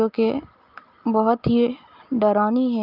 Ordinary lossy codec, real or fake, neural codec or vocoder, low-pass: Opus, 24 kbps; real; none; 5.4 kHz